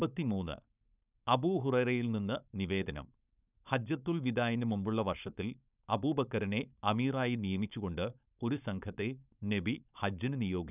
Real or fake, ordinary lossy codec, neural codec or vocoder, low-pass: fake; none; codec, 16 kHz, 4.8 kbps, FACodec; 3.6 kHz